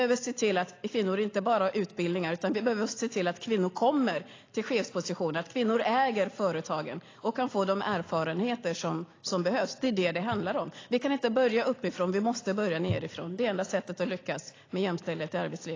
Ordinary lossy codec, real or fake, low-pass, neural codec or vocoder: AAC, 32 kbps; real; 7.2 kHz; none